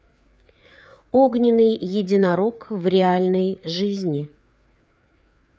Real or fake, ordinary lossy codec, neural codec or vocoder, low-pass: fake; none; codec, 16 kHz, 4 kbps, FreqCodec, larger model; none